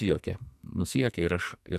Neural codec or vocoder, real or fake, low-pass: codec, 44.1 kHz, 2.6 kbps, SNAC; fake; 14.4 kHz